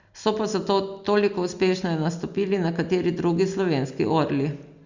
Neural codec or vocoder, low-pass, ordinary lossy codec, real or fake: none; 7.2 kHz; Opus, 64 kbps; real